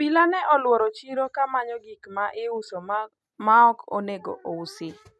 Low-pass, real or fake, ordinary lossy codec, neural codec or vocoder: none; real; none; none